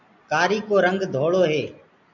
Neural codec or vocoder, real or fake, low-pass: none; real; 7.2 kHz